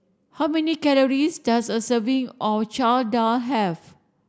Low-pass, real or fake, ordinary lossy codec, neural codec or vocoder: none; real; none; none